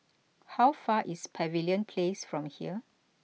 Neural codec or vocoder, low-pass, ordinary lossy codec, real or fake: none; none; none; real